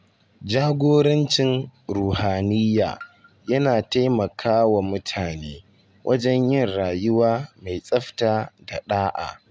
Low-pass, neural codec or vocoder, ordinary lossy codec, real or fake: none; none; none; real